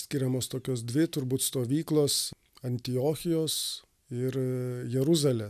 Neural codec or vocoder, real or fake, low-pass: none; real; 14.4 kHz